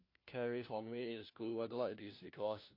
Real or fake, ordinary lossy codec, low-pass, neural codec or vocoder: fake; MP3, 32 kbps; 5.4 kHz; codec, 16 kHz, 1 kbps, FunCodec, trained on LibriTTS, 50 frames a second